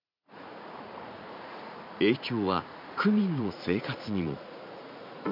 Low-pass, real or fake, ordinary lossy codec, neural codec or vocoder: 5.4 kHz; fake; none; vocoder, 44.1 kHz, 80 mel bands, Vocos